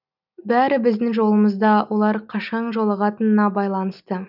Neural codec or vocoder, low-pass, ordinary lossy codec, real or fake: none; 5.4 kHz; none; real